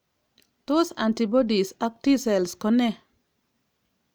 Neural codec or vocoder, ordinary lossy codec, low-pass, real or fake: none; none; none; real